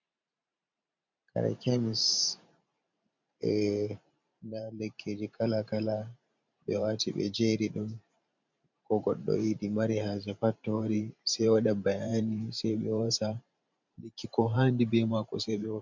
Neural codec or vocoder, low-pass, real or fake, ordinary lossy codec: vocoder, 44.1 kHz, 128 mel bands every 512 samples, BigVGAN v2; 7.2 kHz; fake; MP3, 64 kbps